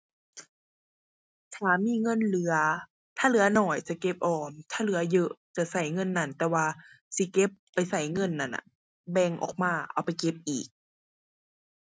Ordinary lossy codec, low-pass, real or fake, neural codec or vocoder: none; none; real; none